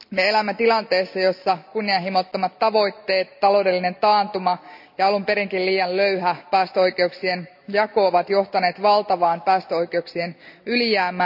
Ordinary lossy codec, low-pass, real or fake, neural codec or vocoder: none; 5.4 kHz; real; none